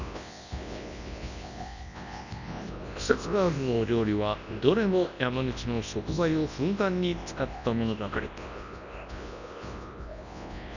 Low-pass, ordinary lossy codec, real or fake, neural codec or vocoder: 7.2 kHz; none; fake; codec, 24 kHz, 0.9 kbps, WavTokenizer, large speech release